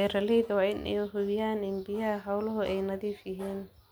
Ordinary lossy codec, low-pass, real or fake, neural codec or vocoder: none; none; real; none